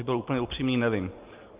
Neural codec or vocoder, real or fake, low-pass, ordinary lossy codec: none; real; 3.6 kHz; Opus, 24 kbps